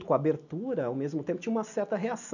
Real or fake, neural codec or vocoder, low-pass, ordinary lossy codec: real; none; 7.2 kHz; none